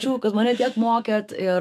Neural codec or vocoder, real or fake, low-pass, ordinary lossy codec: autoencoder, 48 kHz, 128 numbers a frame, DAC-VAE, trained on Japanese speech; fake; 14.4 kHz; AAC, 96 kbps